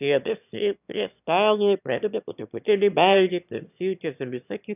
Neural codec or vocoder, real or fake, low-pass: autoencoder, 22.05 kHz, a latent of 192 numbers a frame, VITS, trained on one speaker; fake; 3.6 kHz